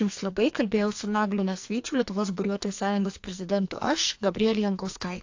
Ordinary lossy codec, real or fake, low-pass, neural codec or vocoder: AAC, 48 kbps; fake; 7.2 kHz; codec, 44.1 kHz, 2.6 kbps, SNAC